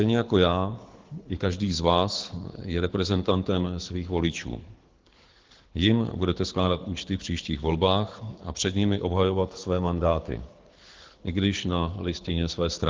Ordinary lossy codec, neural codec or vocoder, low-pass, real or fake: Opus, 16 kbps; codec, 24 kHz, 6 kbps, HILCodec; 7.2 kHz; fake